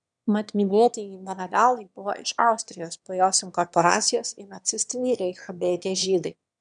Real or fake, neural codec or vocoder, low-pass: fake; autoencoder, 22.05 kHz, a latent of 192 numbers a frame, VITS, trained on one speaker; 9.9 kHz